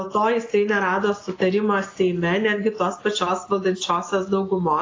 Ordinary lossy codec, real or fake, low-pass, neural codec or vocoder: AAC, 32 kbps; real; 7.2 kHz; none